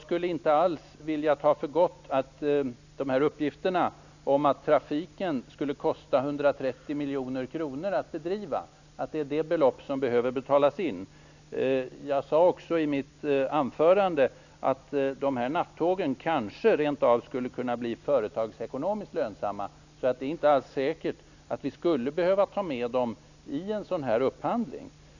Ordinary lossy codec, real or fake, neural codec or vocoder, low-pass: none; real; none; 7.2 kHz